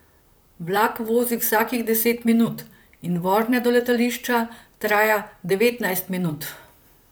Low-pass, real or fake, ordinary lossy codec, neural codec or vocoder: none; fake; none; vocoder, 44.1 kHz, 128 mel bands, Pupu-Vocoder